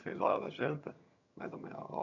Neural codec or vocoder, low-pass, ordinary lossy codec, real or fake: vocoder, 22.05 kHz, 80 mel bands, HiFi-GAN; 7.2 kHz; none; fake